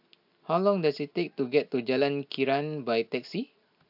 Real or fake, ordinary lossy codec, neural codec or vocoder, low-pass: real; AAC, 48 kbps; none; 5.4 kHz